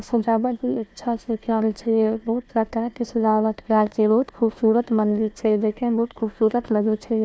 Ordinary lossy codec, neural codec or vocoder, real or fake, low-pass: none; codec, 16 kHz, 1 kbps, FunCodec, trained on Chinese and English, 50 frames a second; fake; none